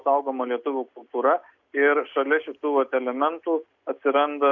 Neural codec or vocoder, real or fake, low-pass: none; real; 7.2 kHz